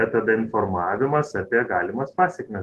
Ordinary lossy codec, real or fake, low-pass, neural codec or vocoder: Opus, 16 kbps; real; 14.4 kHz; none